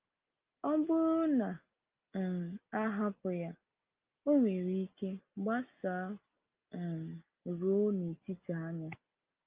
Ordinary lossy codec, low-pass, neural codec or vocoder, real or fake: Opus, 24 kbps; 3.6 kHz; none; real